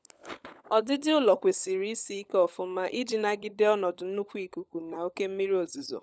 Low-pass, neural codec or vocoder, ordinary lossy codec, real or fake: none; codec, 16 kHz, 16 kbps, FunCodec, trained on LibriTTS, 50 frames a second; none; fake